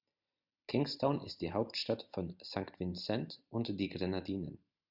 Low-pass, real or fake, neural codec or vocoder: 5.4 kHz; real; none